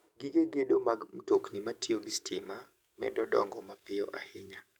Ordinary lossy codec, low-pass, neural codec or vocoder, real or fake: none; 19.8 kHz; codec, 44.1 kHz, 7.8 kbps, DAC; fake